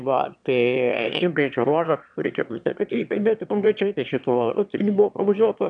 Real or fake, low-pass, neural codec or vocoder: fake; 9.9 kHz; autoencoder, 22.05 kHz, a latent of 192 numbers a frame, VITS, trained on one speaker